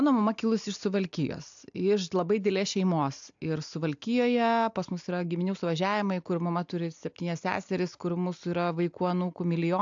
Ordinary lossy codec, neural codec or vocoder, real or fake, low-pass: AAC, 64 kbps; none; real; 7.2 kHz